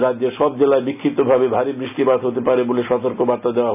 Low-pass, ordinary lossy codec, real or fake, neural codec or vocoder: 3.6 kHz; none; real; none